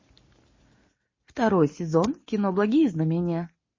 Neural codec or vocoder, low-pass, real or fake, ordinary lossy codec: none; 7.2 kHz; real; MP3, 32 kbps